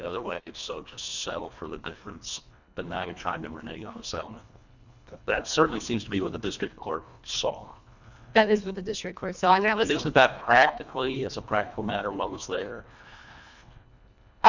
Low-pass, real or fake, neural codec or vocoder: 7.2 kHz; fake; codec, 24 kHz, 1.5 kbps, HILCodec